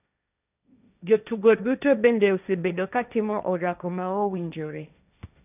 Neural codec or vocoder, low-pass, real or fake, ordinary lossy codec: codec, 16 kHz, 1.1 kbps, Voila-Tokenizer; 3.6 kHz; fake; none